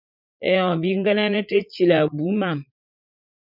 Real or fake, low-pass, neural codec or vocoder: fake; 5.4 kHz; vocoder, 44.1 kHz, 80 mel bands, Vocos